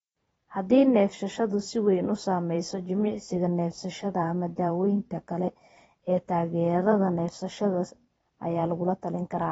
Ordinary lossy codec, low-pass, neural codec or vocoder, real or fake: AAC, 24 kbps; 19.8 kHz; vocoder, 44.1 kHz, 128 mel bands every 512 samples, BigVGAN v2; fake